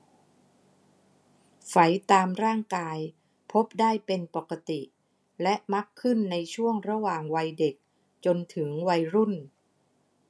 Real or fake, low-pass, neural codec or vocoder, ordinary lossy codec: real; none; none; none